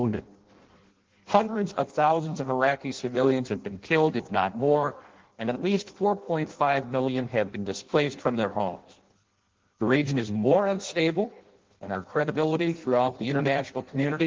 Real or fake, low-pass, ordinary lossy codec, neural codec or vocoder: fake; 7.2 kHz; Opus, 16 kbps; codec, 16 kHz in and 24 kHz out, 0.6 kbps, FireRedTTS-2 codec